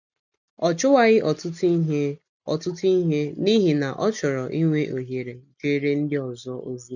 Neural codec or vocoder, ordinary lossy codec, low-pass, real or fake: none; none; 7.2 kHz; real